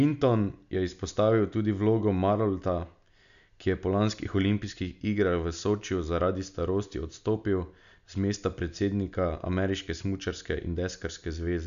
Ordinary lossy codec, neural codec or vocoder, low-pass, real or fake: none; none; 7.2 kHz; real